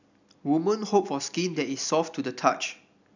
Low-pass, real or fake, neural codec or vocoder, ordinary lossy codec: 7.2 kHz; real; none; none